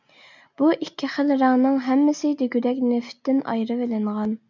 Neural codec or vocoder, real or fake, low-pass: none; real; 7.2 kHz